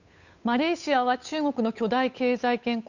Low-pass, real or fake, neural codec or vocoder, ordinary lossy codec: 7.2 kHz; fake; codec, 16 kHz, 8 kbps, FunCodec, trained on Chinese and English, 25 frames a second; none